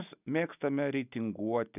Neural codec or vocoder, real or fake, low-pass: none; real; 3.6 kHz